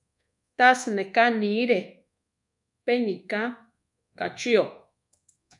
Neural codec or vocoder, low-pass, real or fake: codec, 24 kHz, 1.2 kbps, DualCodec; 10.8 kHz; fake